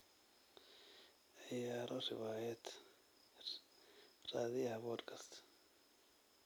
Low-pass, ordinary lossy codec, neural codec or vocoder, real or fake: none; none; none; real